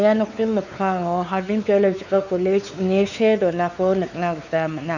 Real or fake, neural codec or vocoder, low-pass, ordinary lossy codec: fake; codec, 16 kHz, 4 kbps, X-Codec, WavLM features, trained on Multilingual LibriSpeech; 7.2 kHz; none